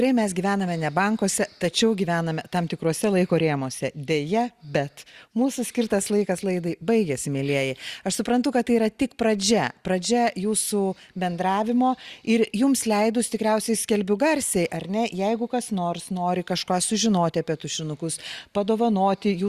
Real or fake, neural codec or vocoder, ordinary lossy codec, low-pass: real; none; Opus, 64 kbps; 14.4 kHz